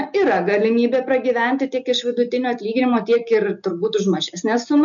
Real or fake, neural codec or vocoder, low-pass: real; none; 7.2 kHz